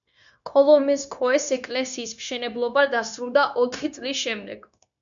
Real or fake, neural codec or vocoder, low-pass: fake; codec, 16 kHz, 0.9 kbps, LongCat-Audio-Codec; 7.2 kHz